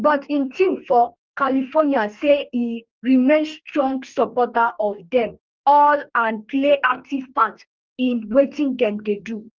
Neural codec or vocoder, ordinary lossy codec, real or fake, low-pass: codec, 44.1 kHz, 2.6 kbps, DAC; Opus, 32 kbps; fake; 7.2 kHz